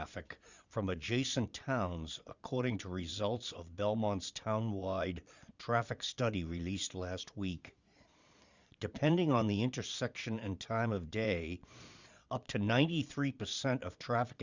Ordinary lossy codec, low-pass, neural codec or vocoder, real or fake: Opus, 64 kbps; 7.2 kHz; vocoder, 22.05 kHz, 80 mel bands, Vocos; fake